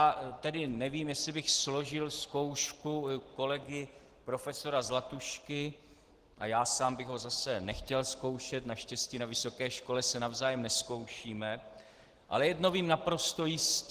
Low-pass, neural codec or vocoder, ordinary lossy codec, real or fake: 14.4 kHz; none; Opus, 16 kbps; real